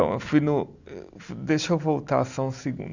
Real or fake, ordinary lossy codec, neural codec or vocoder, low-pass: real; none; none; 7.2 kHz